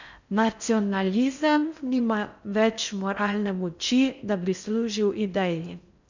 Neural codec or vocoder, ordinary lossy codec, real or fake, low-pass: codec, 16 kHz in and 24 kHz out, 0.6 kbps, FocalCodec, streaming, 4096 codes; none; fake; 7.2 kHz